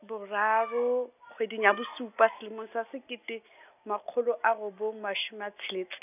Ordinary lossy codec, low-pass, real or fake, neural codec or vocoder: none; 3.6 kHz; real; none